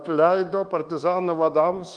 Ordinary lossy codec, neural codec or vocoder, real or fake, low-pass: Opus, 24 kbps; codec, 24 kHz, 1.2 kbps, DualCodec; fake; 9.9 kHz